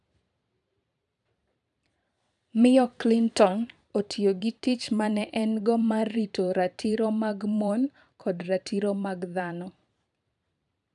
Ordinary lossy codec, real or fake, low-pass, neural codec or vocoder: none; fake; 10.8 kHz; vocoder, 44.1 kHz, 128 mel bands every 512 samples, BigVGAN v2